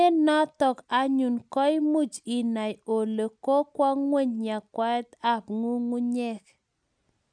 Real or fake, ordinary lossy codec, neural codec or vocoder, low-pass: real; none; none; 9.9 kHz